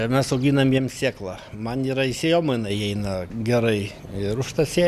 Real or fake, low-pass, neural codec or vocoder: real; 14.4 kHz; none